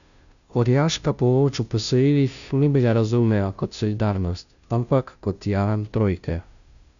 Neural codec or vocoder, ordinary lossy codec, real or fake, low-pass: codec, 16 kHz, 0.5 kbps, FunCodec, trained on Chinese and English, 25 frames a second; none; fake; 7.2 kHz